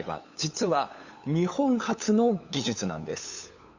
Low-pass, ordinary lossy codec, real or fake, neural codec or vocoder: 7.2 kHz; Opus, 64 kbps; fake; codec, 16 kHz, 8 kbps, FunCodec, trained on LibriTTS, 25 frames a second